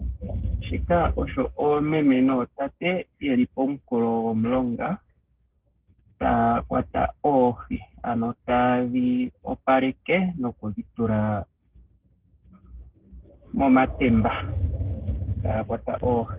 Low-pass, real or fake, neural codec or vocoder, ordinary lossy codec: 3.6 kHz; fake; codec, 16 kHz, 6 kbps, DAC; Opus, 16 kbps